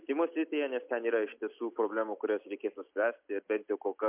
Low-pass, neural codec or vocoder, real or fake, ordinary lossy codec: 3.6 kHz; none; real; MP3, 32 kbps